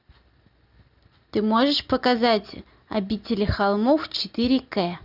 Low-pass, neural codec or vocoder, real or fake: 5.4 kHz; none; real